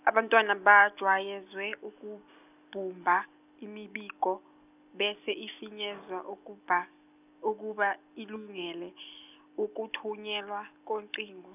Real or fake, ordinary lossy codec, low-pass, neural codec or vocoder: real; none; 3.6 kHz; none